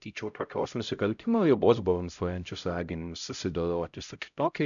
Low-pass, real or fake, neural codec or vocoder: 7.2 kHz; fake; codec, 16 kHz, 0.5 kbps, X-Codec, HuBERT features, trained on LibriSpeech